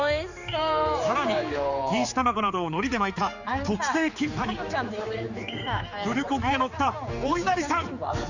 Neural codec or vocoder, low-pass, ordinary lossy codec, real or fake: codec, 16 kHz, 4 kbps, X-Codec, HuBERT features, trained on general audio; 7.2 kHz; none; fake